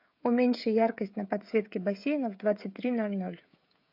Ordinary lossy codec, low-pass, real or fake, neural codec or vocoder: MP3, 48 kbps; 5.4 kHz; fake; codec, 16 kHz, 16 kbps, FreqCodec, smaller model